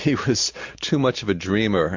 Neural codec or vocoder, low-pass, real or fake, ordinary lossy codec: none; 7.2 kHz; real; MP3, 48 kbps